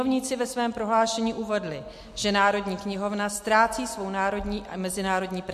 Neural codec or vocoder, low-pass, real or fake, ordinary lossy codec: none; 14.4 kHz; real; MP3, 64 kbps